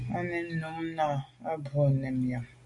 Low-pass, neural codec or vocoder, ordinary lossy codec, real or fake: 10.8 kHz; none; AAC, 64 kbps; real